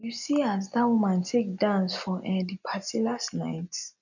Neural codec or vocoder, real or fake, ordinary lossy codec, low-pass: none; real; none; 7.2 kHz